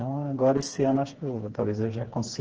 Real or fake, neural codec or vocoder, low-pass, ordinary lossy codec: fake; codec, 16 kHz, 4 kbps, FreqCodec, smaller model; 7.2 kHz; Opus, 16 kbps